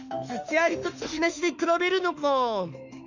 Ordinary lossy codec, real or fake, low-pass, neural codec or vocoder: none; fake; 7.2 kHz; autoencoder, 48 kHz, 32 numbers a frame, DAC-VAE, trained on Japanese speech